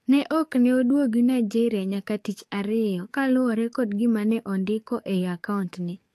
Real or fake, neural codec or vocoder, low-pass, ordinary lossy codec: fake; codec, 44.1 kHz, 7.8 kbps, DAC; 14.4 kHz; AAC, 64 kbps